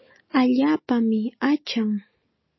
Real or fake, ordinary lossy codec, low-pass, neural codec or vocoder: real; MP3, 24 kbps; 7.2 kHz; none